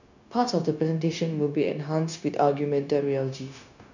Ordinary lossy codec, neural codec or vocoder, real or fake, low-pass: none; codec, 16 kHz, 0.9 kbps, LongCat-Audio-Codec; fake; 7.2 kHz